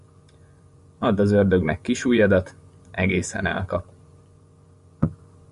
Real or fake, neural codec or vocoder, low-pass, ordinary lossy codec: fake; vocoder, 24 kHz, 100 mel bands, Vocos; 10.8 kHz; Opus, 64 kbps